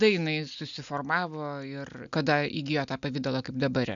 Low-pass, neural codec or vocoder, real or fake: 7.2 kHz; none; real